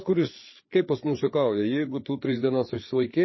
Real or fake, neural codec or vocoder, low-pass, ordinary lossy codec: fake; codec, 16 kHz, 4 kbps, FreqCodec, larger model; 7.2 kHz; MP3, 24 kbps